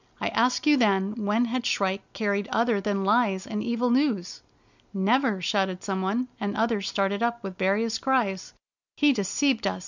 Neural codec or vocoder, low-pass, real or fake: none; 7.2 kHz; real